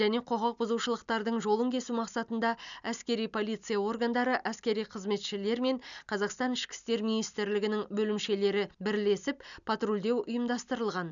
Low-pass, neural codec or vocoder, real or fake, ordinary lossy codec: 7.2 kHz; none; real; none